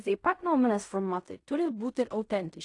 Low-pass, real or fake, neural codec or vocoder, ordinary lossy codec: 10.8 kHz; fake; codec, 16 kHz in and 24 kHz out, 0.4 kbps, LongCat-Audio-Codec, fine tuned four codebook decoder; AAC, 48 kbps